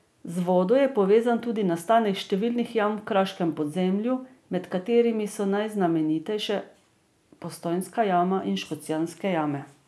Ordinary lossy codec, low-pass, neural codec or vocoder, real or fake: none; none; none; real